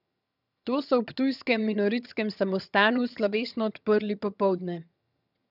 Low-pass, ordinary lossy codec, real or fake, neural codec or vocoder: 5.4 kHz; none; fake; vocoder, 22.05 kHz, 80 mel bands, HiFi-GAN